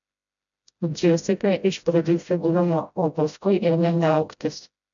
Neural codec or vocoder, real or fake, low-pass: codec, 16 kHz, 0.5 kbps, FreqCodec, smaller model; fake; 7.2 kHz